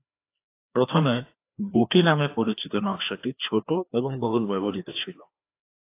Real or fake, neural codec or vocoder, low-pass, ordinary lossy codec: fake; codec, 16 kHz, 2 kbps, FreqCodec, larger model; 3.6 kHz; AAC, 24 kbps